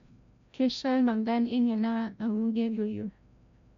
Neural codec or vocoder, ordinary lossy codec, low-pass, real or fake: codec, 16 kHz, 0.5 kbps, FreqCodec, larger model; MP3, 64 kbps; 7.2 kHz; fake